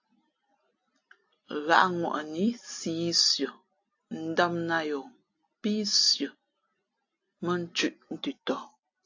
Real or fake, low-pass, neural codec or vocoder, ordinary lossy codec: real; 7.2 kHz; none; AAC, 48 kbps